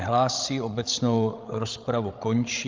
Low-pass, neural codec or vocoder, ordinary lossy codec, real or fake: 7.2 kHz; codec, 16 kHz, 16 kbps, FreqCodec, larger model; Opus, 32 kbps; fake